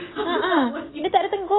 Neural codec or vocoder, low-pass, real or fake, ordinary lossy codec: none; 7.2 kHz; real; AAC, 16 kbps